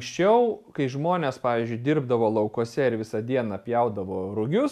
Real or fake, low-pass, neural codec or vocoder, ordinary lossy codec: real; 14.4 kHz; none; MP3, 96 kbps